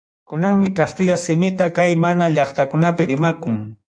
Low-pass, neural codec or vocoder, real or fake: 9.9 kHz; codec, 16 kHz in and 24 kHz out, 1.1 kbps, FireRedTTS-2 codec; fake